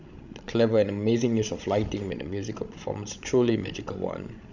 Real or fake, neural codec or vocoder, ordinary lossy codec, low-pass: fake; codec, 16 kHz, 16 kbps, FreqCodec, larger model; none; 7.2 kHz